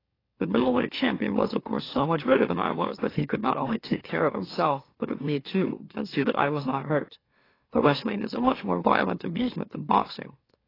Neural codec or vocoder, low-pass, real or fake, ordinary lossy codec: autoencoder, 44.1 kHz, a latent of 192 numbers a frame, MeloTTS; 5.4 kHz; fake; AAC, 24 kbps